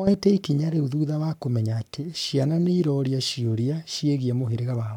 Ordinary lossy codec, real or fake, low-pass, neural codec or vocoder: none; fake; 19.8 kHz; codec, 44.1 kHz, 7.8 kbps, Pupu-Codec